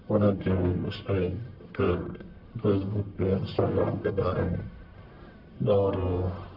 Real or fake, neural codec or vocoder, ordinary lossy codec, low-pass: fake; codec, 44.1 kHz, 1.7 kbps, Pupu-Codec; none; 5.4 kHz